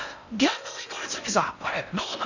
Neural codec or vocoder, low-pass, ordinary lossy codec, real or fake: codec, 16 kHz in and 24 kHz out, 0.6 kbps, FocalCodec, streaming, 4096 codes; 7.2 kHz; none; fake